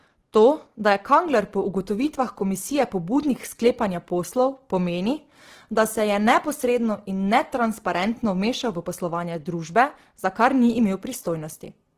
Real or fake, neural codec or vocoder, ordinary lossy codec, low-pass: real; none; Opus, 16 kbps; 14.4 kHz